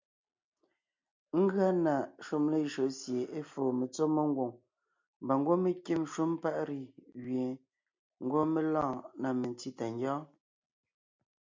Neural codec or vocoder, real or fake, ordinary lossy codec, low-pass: none; real; MP3, 64 kbps; 7.2 kHz